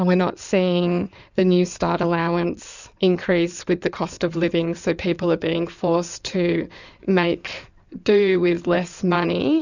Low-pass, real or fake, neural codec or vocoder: 7.2 kHz; fake; codec, 16 kHz in and 24 kHz out, 2.2 kbps, FireRedTTS-2 codec